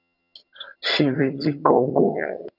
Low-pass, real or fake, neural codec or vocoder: 5.4 kHz; fake; vocoder, 22.05 kHz, 80 mel bands, HiFi-GAN